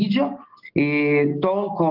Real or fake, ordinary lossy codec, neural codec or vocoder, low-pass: real; Opus, 24 kbps; none; 5.4 kHz